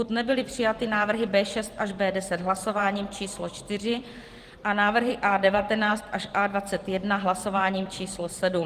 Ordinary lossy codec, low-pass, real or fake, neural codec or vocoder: Opus, 24 kbps; 14.4 kHz; fake; vocoder, 44.1 kHz, 128 mel bands every 512 samples, BigVGAN v2